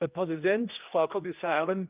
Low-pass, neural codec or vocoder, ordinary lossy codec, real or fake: 3.6 kHz; codec, 16 kHz, 1 kbps, X-Codec, HuBERT features, trained on general audio; Opus, 24 kbps; fake